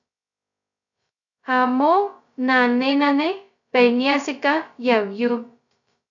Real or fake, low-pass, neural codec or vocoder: fake; 7.2 kHz; codec, 16 kHz, 0.2 kbps, FocalCodec